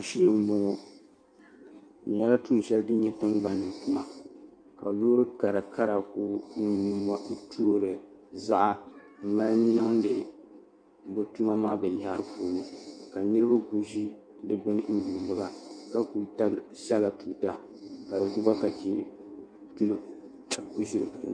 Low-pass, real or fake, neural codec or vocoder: 9.9 kHz; fake; codec, 16 kHz in and 24 kHz out, 1.1 kbps, FireRedTTS-2 codec